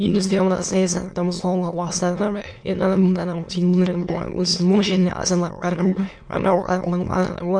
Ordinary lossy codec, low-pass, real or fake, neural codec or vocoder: MP3, 64 kbps; 9.9 kHz; fake; autoencoder, 22.05 kHz, a latent of 192 numbers a frame, VITS, trained on many speakers